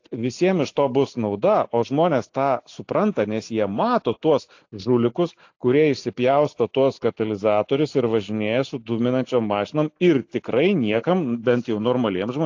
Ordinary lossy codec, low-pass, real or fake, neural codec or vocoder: AAC, 48 kbps; 7.2 kHz; real; none